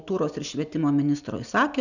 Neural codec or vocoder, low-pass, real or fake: none; 7.2 kHz; real